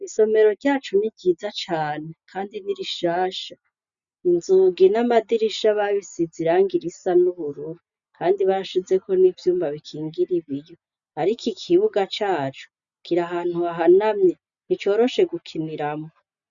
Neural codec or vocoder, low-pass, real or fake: none; 7.2 kHz; real